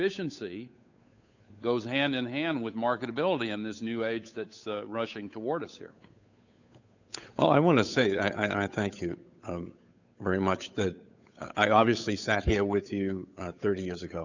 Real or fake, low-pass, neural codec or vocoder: fake; 7.2 kHz; codec, 16 kHz, 8 kbps, FunCodec, trained on Chinese and English, 25 frames a second